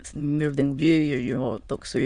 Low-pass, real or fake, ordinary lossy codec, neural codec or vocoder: 9.9 kHz; fake; Opus, 64 kbps; autoencoder, 22.05 kHz, a latent of 192 numbers a frame, VITS, trained on many speakers